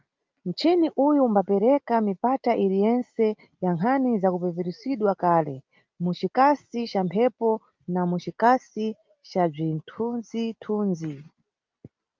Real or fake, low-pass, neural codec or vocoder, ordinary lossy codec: real; 7.2 kHz; none; Opus, 24 kbps